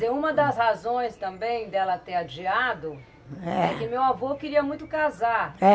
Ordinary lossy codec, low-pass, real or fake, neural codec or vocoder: none; none; real; none